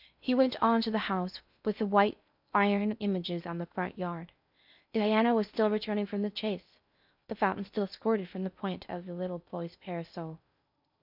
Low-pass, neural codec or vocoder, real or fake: 5.4 kHz; codec, 16 kHz in and 24 kHz out, 0.6 kbps, FocalCodec, streaming, 2048 codes; fake